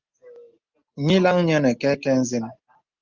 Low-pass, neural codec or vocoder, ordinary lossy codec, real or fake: 7.2 kHz; none; Opus, 32 kbps; real